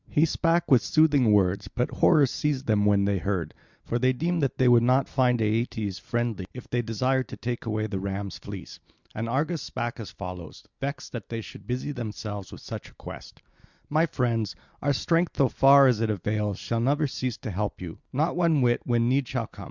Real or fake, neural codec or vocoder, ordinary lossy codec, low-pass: real; none; Opus, 64 kbps; 7.2 kHz